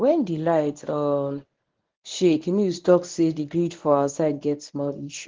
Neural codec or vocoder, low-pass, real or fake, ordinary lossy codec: codec, 24 kHz, 0.9 kbps, WavTokenizer, medium speech release version 1; 7.2 kHz; fake; Opus, 16 kbps